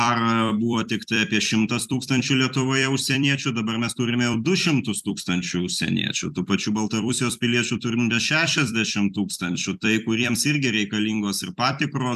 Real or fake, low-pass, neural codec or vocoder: fake; 14.4 kHz; vocoder, 44.1 kHz, 128 mel bands every 256 samples, BigVGAN v2